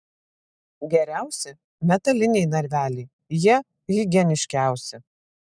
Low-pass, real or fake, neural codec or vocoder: 9.9 kHz; real; none